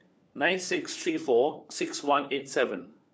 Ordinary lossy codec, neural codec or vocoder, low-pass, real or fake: none; codec, 16 kHz, 4 kbps, FunCodec, trained on LibriTTS, 50 frames a second; none; fake